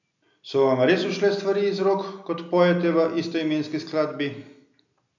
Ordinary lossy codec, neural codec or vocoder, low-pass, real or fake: none; none; 7.2 kHz; real